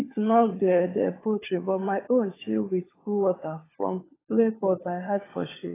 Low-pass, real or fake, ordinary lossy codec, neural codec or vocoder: 3.6 kHz; fake; AAC, 16 kbps; codec, 16 kHz, 16 kbps, FunCodec, trained on LibriTTS, 50 frames a second